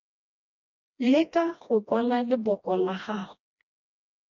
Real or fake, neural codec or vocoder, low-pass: fake; codec, 16 kHz, 1 kbps, FreqCodec, smaller model; 7.2 kHz